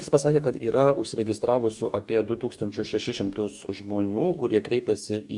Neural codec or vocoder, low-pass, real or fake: codec, 44.1 kHz, 2.6 kbps, DAC; 10.8 kHz; fake